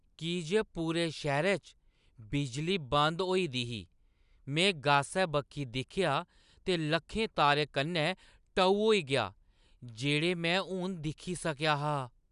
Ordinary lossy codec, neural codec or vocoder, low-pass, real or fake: none; none; 10.8 kHz; real